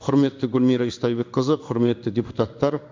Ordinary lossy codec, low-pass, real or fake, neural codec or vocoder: MP3, 64 kbps; 7.2 kHz; fake; codec, 16 kHz in and 24 kHz out, 1 kbps, XY-Tokenizer